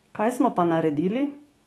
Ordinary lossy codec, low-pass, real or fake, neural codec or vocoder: AAC, 32 kbps; 19.8 kHz; fake; autoencoder, 48 kHz, 128 numbers a frame, DAC-VAE, trained on Japanese speech